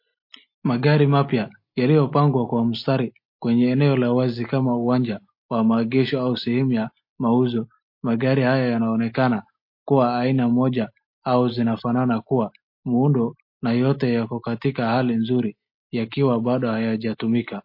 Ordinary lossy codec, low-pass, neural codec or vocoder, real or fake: MP3, 32 kbps; 5.4 kHz; none; real